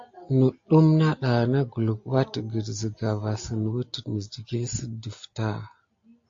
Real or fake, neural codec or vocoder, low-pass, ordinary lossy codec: real; none; 7.2 kHz; AAC, 48 kbps